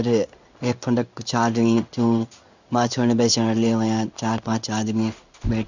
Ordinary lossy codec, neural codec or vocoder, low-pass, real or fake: none; codec, 16 kHz in and 24 kHz out, 1 kbps, XY-Tokenizer; 7.2 kHz; fake